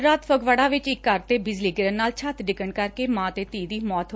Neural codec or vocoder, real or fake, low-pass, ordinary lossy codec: none; real; none; none